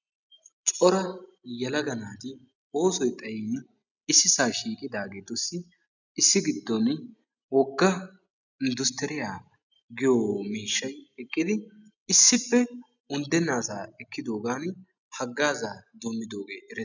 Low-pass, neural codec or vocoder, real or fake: 7.2 kHz; none; real